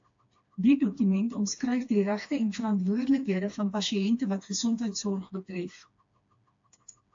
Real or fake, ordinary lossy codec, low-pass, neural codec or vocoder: fake; AAC, 48 kbps; 7.2 kHz; codec, 16 kHz, 2 kbps, FreqCodec, smaller model